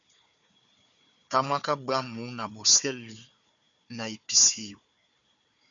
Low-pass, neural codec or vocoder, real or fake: 7.2 kHz; codec, 16 kHz, 4 kbps, FunCodec, trained on Chinese and English, 50 frames a second; fake